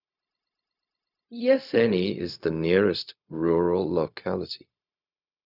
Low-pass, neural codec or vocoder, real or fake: 5.4 kHz; codec, 16 kHz, 0.4 kbps, LongCat-Audio-Codec; fake